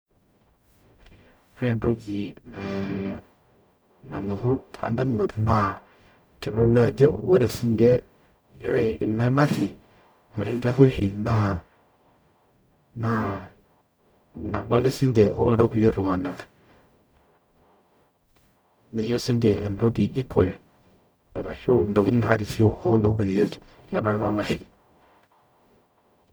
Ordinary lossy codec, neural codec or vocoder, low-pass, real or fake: none; codec, 44.1 kHz, 0.9 kbps, DAC; none; fake